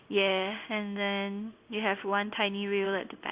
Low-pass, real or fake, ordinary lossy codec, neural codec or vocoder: 3.6 kHz; fake; Opus, 64 kbps; codec, 16 kHz in and 24 kHz out, 1 kbps, XY-Tokenizer